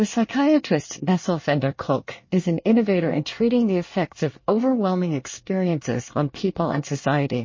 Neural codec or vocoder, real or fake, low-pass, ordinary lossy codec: codec, 24 kHz, 1 kbps, SNAC; fake; 7.2 kHz; MP3, 32 kbps